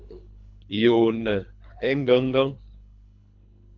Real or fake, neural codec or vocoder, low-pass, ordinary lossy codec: fake; codec, 24 kHz, 3 kbps, HILCodec; 7.2 kHz; AAC, 48 kbps